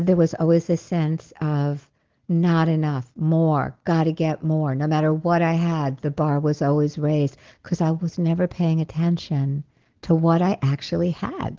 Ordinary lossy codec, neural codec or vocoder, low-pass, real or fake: Opus, 16 kbps; none; 7.2 kHz; real